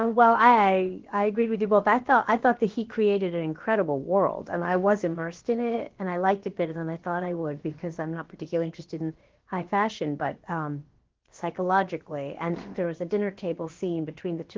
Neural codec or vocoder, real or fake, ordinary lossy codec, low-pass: codec, 16 kHz, about 1 kbps, DyCAST, with the encoder's durations; fake; Opus, 16 kbps; 7.2 kHz